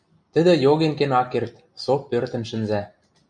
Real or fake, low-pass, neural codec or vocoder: real; 9.9 kHz; none